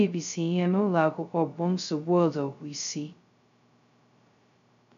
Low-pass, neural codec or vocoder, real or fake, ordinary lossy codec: 7.2 kHz; codec, 16 kHz, 0.2 kbps, FocalCodec; fake; none